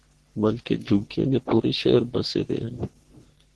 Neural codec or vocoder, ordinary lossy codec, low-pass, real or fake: codec, 44.1 kHz, 3.4 kbps, Pupu-Codec; Opus, 16 kbps; 10.8 kHz; fake